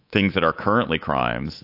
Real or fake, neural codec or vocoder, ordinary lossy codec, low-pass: fake; codec, 24 kHz, 3.1 kbps, DualCodec; AAC, 32 kbps; 5.4 kHz